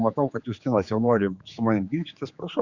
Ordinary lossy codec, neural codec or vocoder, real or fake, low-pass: Opus, 64 kbps; codec, 16 kHz, 4 kbps, X-Codec, HuBERT features, trained on general audio; fake; 7.2 kHz